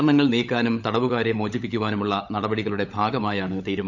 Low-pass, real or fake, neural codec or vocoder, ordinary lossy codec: 7.2 kHz; fake; codec, 16 kHz, 16 kbps, FunCodec, trained on LibriTTS, 50 frames a second; none